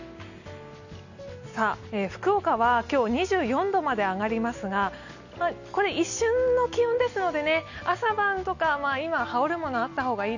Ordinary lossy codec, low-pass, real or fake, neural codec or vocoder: none; 7.2 kHz; real; none